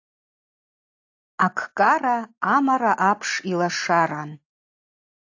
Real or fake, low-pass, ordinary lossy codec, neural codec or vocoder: real; 7.2 kHz; AAC, 48 kbps; none